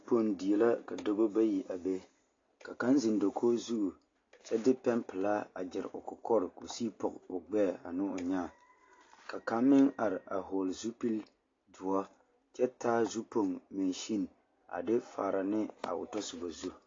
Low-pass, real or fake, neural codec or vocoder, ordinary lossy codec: 7.2 kHz; real; none; AAC, 32 kbps